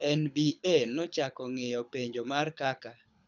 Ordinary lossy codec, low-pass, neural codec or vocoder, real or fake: none; 7.2 kHz; codec, 24 kHz, 6 kbps, HILCodec; fake